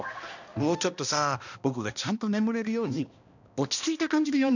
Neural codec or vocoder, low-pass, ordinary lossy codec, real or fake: codec, 16 kHz, 1 kbps, X-Codec, HuBERT features, trained on balanced general audio; 7.2 kHz; none; fake